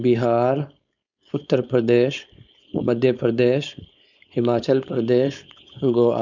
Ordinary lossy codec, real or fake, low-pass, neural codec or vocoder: none; fake; 7.2 kHz; codec, 16 kHz, 4.8 kbps, FACodec